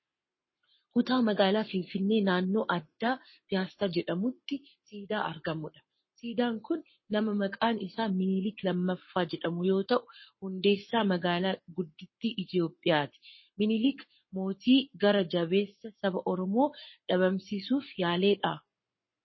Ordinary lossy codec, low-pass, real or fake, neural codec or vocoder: MP3, 24 kbps; 7.2 kHz; fake; codec, 44.1 kHz, 7.8 kbps, Pupu-Codec